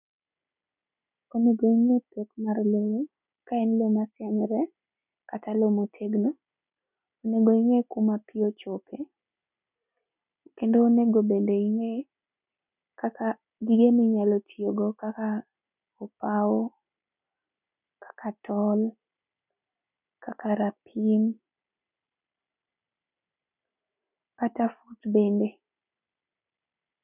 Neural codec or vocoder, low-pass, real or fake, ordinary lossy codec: none; 3.6 kHz; real; none